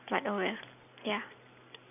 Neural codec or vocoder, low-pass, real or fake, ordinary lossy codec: none; 3.6 kHz; real; none